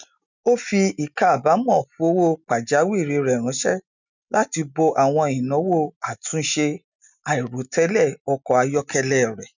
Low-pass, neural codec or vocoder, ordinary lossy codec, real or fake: 7.2 kHz; none; none; real